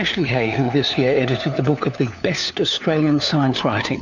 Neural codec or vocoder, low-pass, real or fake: codec, 16 kHz, 4 kbps, FunCodec, trained on LibriTTS, 50 frames a second; 7.2 kHz; fake